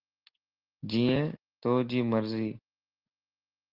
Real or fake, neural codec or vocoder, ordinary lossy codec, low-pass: real; none; Opus, 16 kbps; 5.4 kHz